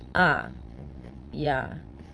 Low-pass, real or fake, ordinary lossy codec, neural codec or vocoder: none; fake; none; vocoder, 22.05 kHz, 80 mel bands, Vocos